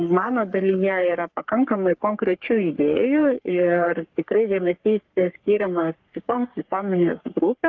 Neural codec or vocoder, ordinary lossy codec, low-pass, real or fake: codec, 44.1 kHz, 3.4 kbps, Pupu-Codec; Opus, 24 kbps; 7.2 kHz; fake